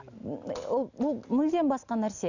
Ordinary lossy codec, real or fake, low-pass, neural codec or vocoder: none; real; 7.2 kHz; none